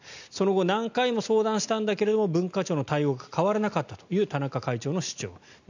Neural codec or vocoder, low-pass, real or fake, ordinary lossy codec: none; 7.2 kHz; real; none